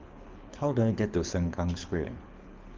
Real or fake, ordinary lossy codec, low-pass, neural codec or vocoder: fake; Opus, 32 kbps; 7.2 kHz; codec, 24 kHz, 6 kbps, HILCodec